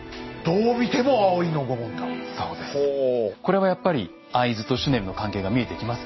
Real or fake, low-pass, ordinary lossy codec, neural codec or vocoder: real; 7.2 kHz; MP3, 24 kbps; none